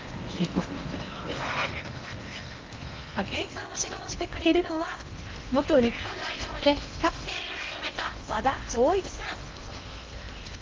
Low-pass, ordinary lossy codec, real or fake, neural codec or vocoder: 7.2 kHz; Opus, 24 kbps; fake; codec, 16 kHz in and 24 kHz out, 0.8 kbps, FocalCodec, streaming, 65536 codes